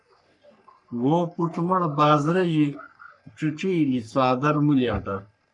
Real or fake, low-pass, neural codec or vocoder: fake; 10.8 kHz; codec, 44.1 kHz, 3.4 kbps, Pupu-Codec